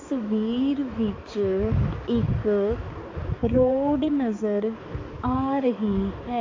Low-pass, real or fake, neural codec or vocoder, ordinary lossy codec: 7.2 kHz; fake; codec, 16 kHz, 4 kbps, X-Codec, HuBERT features, trained on balanced general audio; AAC, 32 kbps